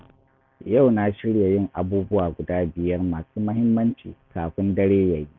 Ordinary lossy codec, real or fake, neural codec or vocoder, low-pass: none; real; none; 7.2 kHz